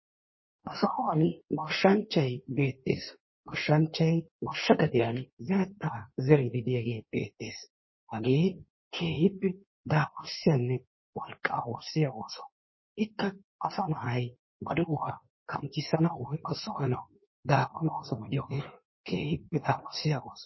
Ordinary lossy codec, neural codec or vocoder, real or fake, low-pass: MP3, 24 kbps; codec, 16 kHz in and 24 kHz out, 1.1 kbps, FireRedTTS-2 codec; fake; 7.2 kHz